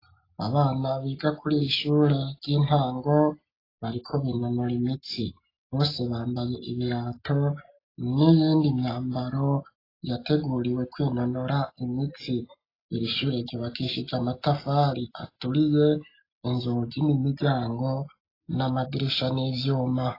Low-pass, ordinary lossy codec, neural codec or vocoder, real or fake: 5.4 kHz; AAC, 24 kbps; none; real